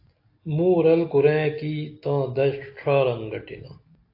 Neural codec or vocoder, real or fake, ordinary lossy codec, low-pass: none; real; AAC, 32 kbps; 5.4 kHz